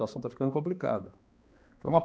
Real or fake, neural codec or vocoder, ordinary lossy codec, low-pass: fake; codec, 16 kHz, 2 kbps, X-Codec, HuBERT features, trained on balanced general audio; none; none